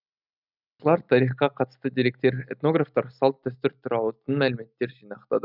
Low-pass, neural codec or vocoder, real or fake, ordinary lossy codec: 5.4 kHz; none; real; none